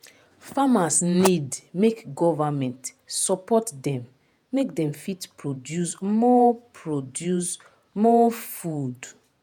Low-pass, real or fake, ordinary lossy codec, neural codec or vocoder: 19.8 kHz; fake; none; vocoder, 48 kHz, 128 mel bands, Vocos